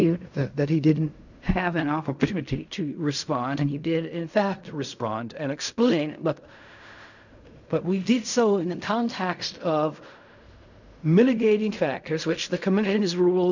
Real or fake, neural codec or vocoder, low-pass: fake; codec, 16 kHz in and 24 kHz out, 0.4 kbps, LongCat-Audio-Codec, fine tuned four codebook decoder; 7.2 kHz